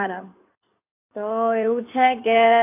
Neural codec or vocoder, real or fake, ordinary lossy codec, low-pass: codec, 16 kHz in and 24 kHz out, 2.2 kbps, FireRedTTS-2 codec; fake; AAC, 24 kbps; 3.6 kHz